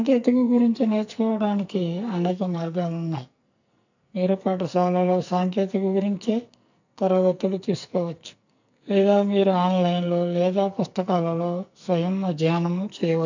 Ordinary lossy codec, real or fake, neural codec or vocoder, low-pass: none; fake; codec, 44.1 kHz, 2.6 kbps, SNAC; 7.2 kHz